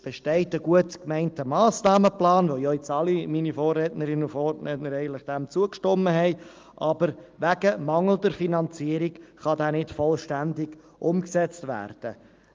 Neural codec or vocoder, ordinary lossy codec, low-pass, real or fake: none; Opus, 32 kbps; 7.2 kHz; real